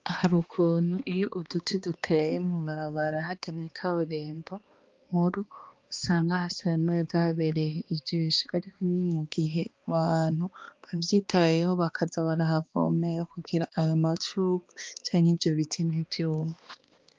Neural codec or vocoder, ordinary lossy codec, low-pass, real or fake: codec, 16 kHz, 2 kbps, X-Codec, HuBERT features, trained on balanced general audio; Opus, 32 kbps; 7.2 kHz; fake